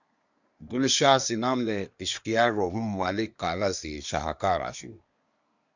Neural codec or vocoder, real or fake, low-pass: codec, 24 kHz, 1 kbps, SNAC; fake; 7.2 kHz